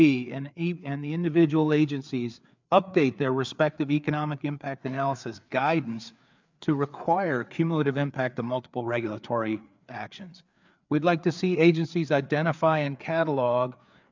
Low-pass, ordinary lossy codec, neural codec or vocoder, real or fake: 7.2 kHz; MP3, 64 kbps; codec, 16 kHz, 4 kbps, FreqCodec, larger model; fake